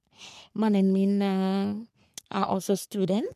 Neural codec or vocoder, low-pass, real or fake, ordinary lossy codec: codec, 44.1 kHz, 3.4 kbps, Pupu-Codec; 14.4 kHz; fake; none